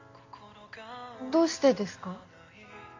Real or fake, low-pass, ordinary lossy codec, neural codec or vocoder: real; 7.2 kHz; MP3, 48 kbps; none